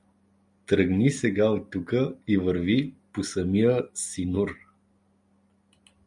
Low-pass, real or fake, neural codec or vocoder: 10.8 kHz; real; none